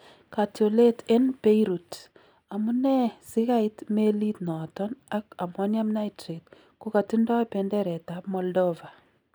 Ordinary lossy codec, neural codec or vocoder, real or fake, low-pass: none; none; real; none